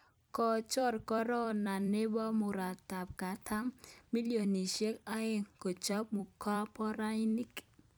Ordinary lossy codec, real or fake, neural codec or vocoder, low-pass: none; fake; vocoder, 44.1 kHz, 128 mel bands every 256 samples, BigVGAN v2; none